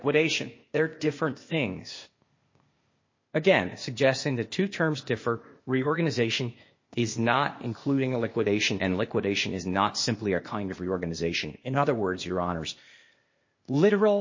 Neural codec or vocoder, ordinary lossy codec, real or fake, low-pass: codec, 16 kHz, 0.8 kbps, ZipCodec; MP3, 32 kbps; fake; 7.2 kHz